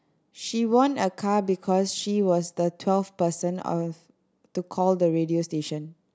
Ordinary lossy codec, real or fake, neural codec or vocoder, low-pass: none; real; none; none